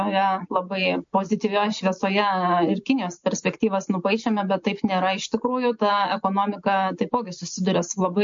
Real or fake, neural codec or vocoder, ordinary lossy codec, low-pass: real; none; MP3, 48 kbps; 7.2 kHz